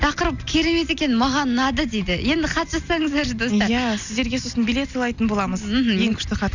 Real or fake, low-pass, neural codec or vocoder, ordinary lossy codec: real; 7.2 kHz; none; none